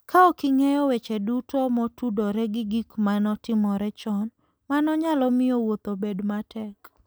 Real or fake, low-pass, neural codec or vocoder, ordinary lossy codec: real; none; none; none